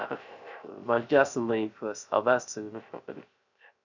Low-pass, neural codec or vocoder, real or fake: 7.2 kHz; codec, 16 kHz, 0.3 kbps, FocalCodec; fake